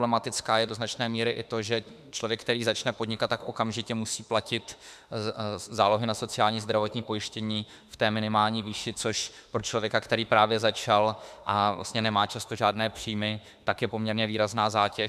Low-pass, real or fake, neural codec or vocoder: 14.4 kHz; fake; autoencoder, 48 kHz, 32 numbers a frame, DAC-VAE, trained on Japanese speech